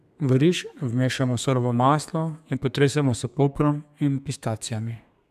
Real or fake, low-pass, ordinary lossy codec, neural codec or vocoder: fake; 14.4 kHz; none; codec, 32 kHz, 1.9 kbps, SNAC